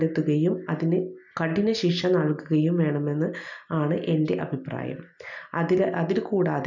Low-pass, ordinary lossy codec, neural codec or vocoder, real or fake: 7.2 kHz; none; none; real